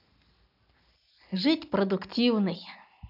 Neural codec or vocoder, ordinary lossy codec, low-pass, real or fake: none; none; 5.4 kHz; real